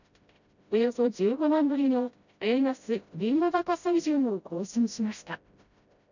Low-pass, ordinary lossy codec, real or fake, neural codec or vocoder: 7.2 kHz; none; fake; codec, 16 kHz, 0.5 kbps, FreqCodec, smaller model